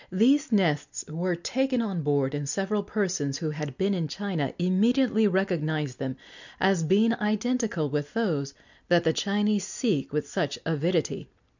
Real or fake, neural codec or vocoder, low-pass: real; none; 7.2 kHz